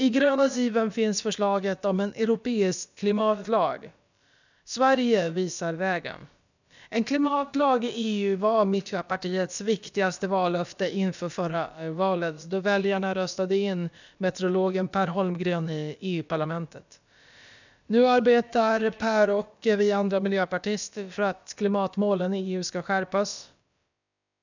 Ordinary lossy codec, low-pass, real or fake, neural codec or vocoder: none; 7.2 kHz; fake; codec, 16 kHz, about 1 kbps, DyCAST, with the encoder's durations